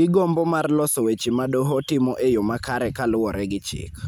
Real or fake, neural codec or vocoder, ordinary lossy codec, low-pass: real; none; none; none